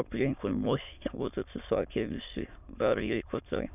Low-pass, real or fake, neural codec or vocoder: 3.6 kHz; fake; autoencoder, 22.05 kHz, a latent of 192 numbers a frame, VITS, trained on many speakers